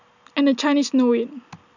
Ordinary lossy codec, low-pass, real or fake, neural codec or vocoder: none; 7.2 kHz; real; none